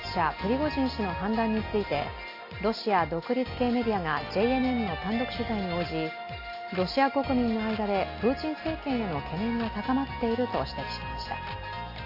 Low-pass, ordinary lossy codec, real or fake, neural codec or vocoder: 5.4 kHz; AAC, 32 kbps; real; none